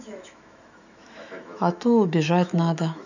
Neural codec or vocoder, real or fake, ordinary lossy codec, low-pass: autoencoder, 48 kHz, 128 numbers a frame, DAC-VAE, trained on Japanese speech; fake; none; 7.2 kHz